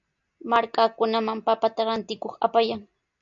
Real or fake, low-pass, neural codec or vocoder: real; 7.2 kHz; none